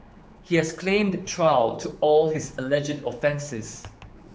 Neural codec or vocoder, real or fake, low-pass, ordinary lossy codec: codec, 16 kHz, 4 kbps, X-Codec, HuBERT features, trained on general audio; fake; none; none